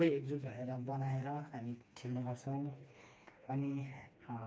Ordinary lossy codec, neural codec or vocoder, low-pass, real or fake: none; codec, 16 kHz, 2 kbps, FreqCodec, smaller model; none; fake